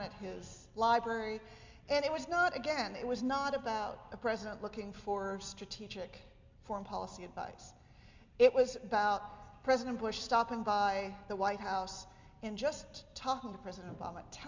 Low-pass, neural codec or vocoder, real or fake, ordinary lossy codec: 7.2 kHz; none; real; MP3, 64 kbps